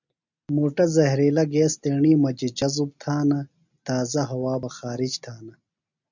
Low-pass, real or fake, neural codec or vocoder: 7.2 kHz; real; none